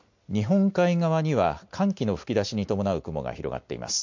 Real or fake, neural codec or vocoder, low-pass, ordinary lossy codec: real; none; 7.2 kHz; MP3, 48 kbps